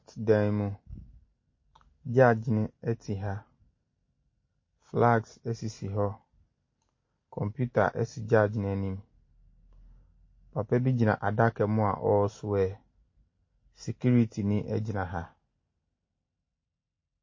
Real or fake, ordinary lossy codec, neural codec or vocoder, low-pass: real; MP3, 32 kbps; none; 7.2 kHz